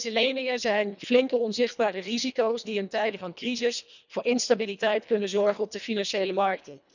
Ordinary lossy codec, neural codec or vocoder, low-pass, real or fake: none; codec, 24 kHz, 1.5 kbps, HILCodec; 7.2 kHz; fake